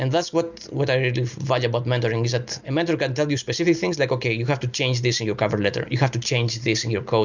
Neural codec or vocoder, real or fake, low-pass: none; real; 7.2 kHz